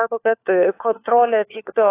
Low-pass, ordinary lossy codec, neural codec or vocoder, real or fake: 3.6 kHz; AAC, 24 kbps; codec, 16 kHz, 4 kbps, FunCodec, trained on LibriTTS, 50 frames a second; fake